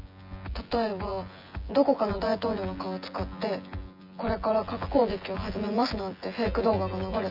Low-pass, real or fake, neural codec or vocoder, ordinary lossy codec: 5.4 kHz; fake; vocoder, 24 kHz, 100 mel bands, Vocos; none